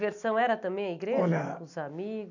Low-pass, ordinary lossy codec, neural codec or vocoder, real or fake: 7.2 kHz; none; none; real